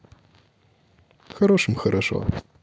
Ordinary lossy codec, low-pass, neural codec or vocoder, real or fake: none; none; none; real